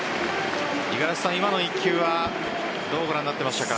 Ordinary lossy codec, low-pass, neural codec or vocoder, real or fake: none; none; none; real